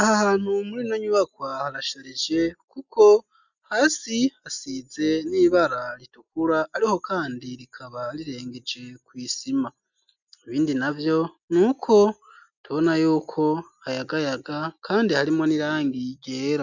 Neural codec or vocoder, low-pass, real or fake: none; 7.2 kHz; real